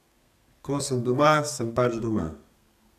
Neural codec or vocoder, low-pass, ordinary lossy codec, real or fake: codec, 32 kHz, 1.9 kbps, SNAC; 14.4 kHz; none; fake